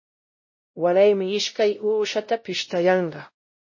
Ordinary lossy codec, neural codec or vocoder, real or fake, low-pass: MP3, 32 kbps; codec, 16 kHz, 0.5 kbps, X-Codec, WavLM features, trained on Multilingual LibriSpeech; fake; 7.2 kHz